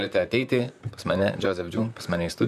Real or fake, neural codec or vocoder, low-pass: fake; vocoder, 44.1 kHz, 128 mel bands, Pupu-Vocoder; 14.4 kHz